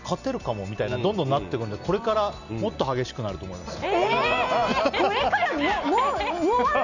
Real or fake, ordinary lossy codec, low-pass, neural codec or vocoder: real; none; 7.2 kHz; none